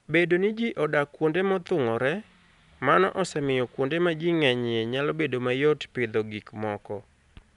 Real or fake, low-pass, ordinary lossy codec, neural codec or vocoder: real; 10.8 kHz; none; none